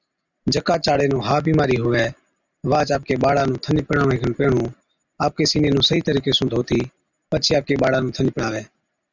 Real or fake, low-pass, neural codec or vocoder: real; 7.2 kHz; none